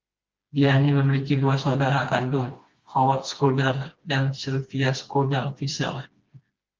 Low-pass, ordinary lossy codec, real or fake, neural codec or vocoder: 7.2 kHz; Opus, 32 kbps; fake; codec, 16 kHz, 2 kbps, FreqCodec, smaller model